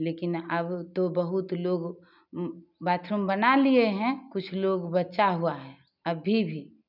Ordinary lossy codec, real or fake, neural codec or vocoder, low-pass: none; real; none; 5.4 kHz